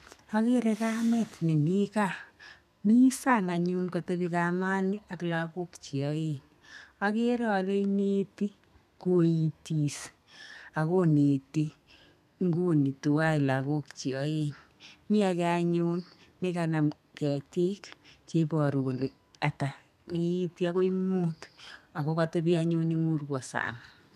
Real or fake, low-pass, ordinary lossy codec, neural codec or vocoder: fake; 14.4 kHz; none; codec, 32 kHz, 1.9 kbps, SNAC